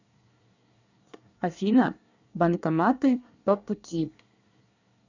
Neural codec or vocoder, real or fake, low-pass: codec, 24 kHz, 1 kbps, SNAC; fake; 7.2 kHz